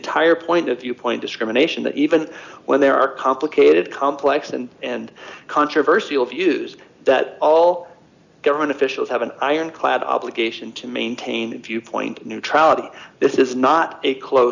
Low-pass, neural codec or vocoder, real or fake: 7.2 kHz; none; real